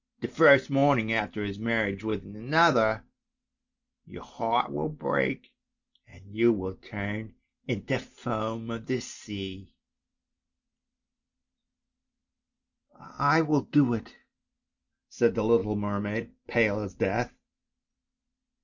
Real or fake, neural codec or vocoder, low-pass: real; none; 7.2 kHz